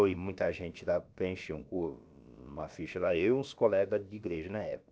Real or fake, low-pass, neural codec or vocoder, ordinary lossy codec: fake; none; codec, 16 kHz, about 1 kbps, DyCAST, with the encoder's durations; none